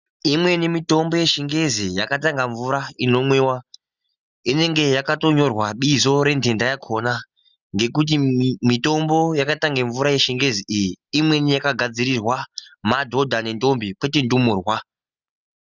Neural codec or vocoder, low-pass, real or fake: none; 7.2 kHz; real